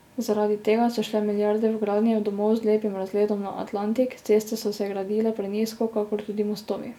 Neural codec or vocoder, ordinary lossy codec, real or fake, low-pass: none; none; real; 19.8 kHz